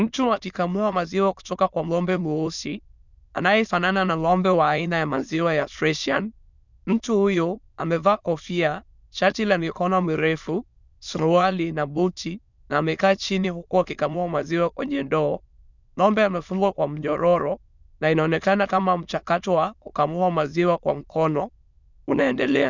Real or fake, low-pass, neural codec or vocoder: fake; 7.2 kHz; autoencoder, 22.05 kHz, a latent of 192 numbers a frame, VITS, trained on many speakers